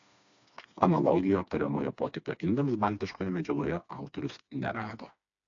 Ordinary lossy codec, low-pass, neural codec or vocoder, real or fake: AAC, 48 kbps; 7.2 kHz; codec, 16 kHz, 2 kbps, FreqCodec, smaller model; fake